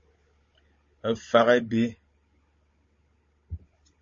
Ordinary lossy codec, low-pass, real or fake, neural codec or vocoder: MP3, 32 kbps; 7.2 kHz; fake; codec, 16 kHz, 16 kbps, FunCodec, trained on Chinese and English, 50 frames a second